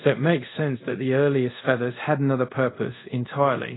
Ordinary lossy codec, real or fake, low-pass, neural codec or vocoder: AAC, 16 kbps; fake; 7.2 kHz; codec, 24 kHz, 0.5 kbps, DualCodec